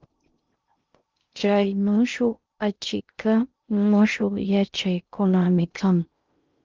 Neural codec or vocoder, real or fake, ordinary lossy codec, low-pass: codec, 16 kHz in and 24 kHz out, 0.6 kbps, FocalCodec, streaming, 2048 codes; fake; Opus, 32 kbps; 7.2 kHz